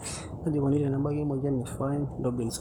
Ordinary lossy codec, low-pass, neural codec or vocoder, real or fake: none; none; codec, 44.1 kHz, 7.8 kbps, Pupu-Codec; fake